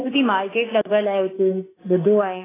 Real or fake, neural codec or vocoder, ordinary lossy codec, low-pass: fake; autoencoder, 48 kHz, 32 numbers a frame, DAC-VAE, trained on Japanese speech; AAC, 16 kbps; 3.6 kHz